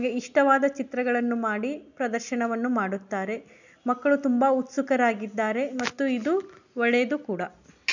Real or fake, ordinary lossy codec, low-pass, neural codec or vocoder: real; none; 7.2 kHz; none